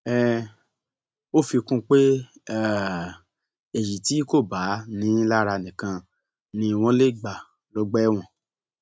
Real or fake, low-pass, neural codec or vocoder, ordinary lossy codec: real; none; none; none